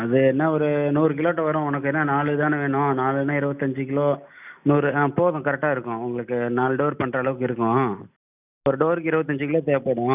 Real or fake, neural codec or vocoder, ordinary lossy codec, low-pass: real; none; none; 3.6 kHz